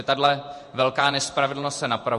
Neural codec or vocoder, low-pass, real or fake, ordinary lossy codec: vocoder, 48 kHz, 128 mel bands, Vocos; 14.4 kHz; fake; MP3, 48 kbps